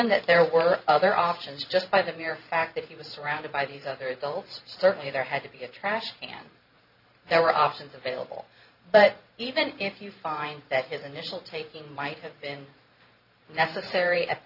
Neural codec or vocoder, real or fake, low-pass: vocoder, 44.1 kHz, 128 mel bands every 512 samples, BigVGAN v2; fake; 5.4 kHz